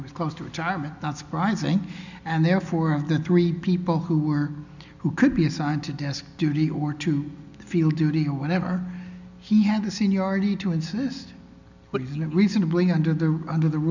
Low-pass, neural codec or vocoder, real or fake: 7.2 kHz; none; real